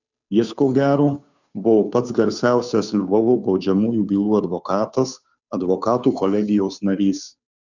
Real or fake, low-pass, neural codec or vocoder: fake; 7.2 kHz; codec, 16 kHz, 2 kbps, FunCodec, trained on Chinese and English, 25 frames a second